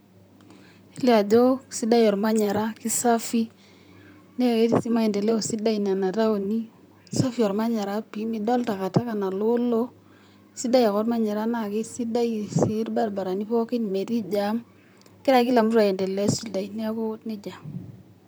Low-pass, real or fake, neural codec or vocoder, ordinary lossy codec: none; fake; vocoder, 44.1 kHz, 128 mel bands, Pupu-Vocoder; none